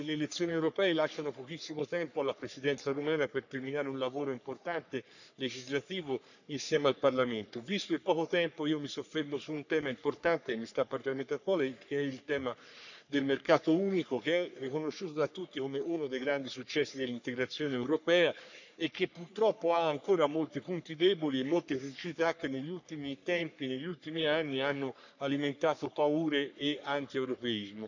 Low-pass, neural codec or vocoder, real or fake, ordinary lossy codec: 7.2 kHz; codec, 44.1 kHz, 3.4 kbps, Pupu-Codec; fake; none